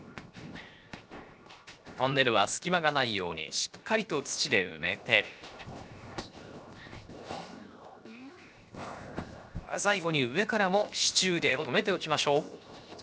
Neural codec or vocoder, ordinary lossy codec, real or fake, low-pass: codec, 16 kHz, 0.7 kbps, FocalCodec; none; fake; none